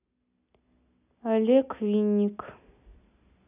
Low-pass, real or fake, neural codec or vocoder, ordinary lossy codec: 3.6 kHz; real; none; none